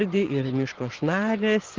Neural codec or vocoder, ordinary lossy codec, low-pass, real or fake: vocoder, 24 kHz, 100 mel bands, Vocos; Opus, 16 kbps; 7.2 kHz; fake